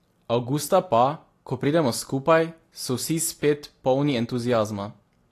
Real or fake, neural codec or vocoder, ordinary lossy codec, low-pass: real; none; AAC, 48 kbps; 14.4 kHz